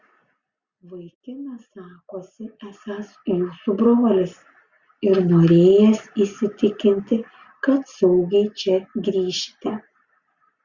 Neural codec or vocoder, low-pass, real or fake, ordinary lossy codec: none; 7.2 kHz; real; Opus, 64 kbps